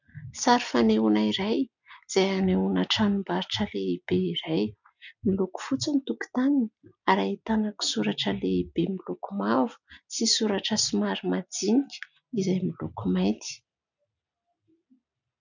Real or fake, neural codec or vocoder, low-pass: real; none; 7.2 kHz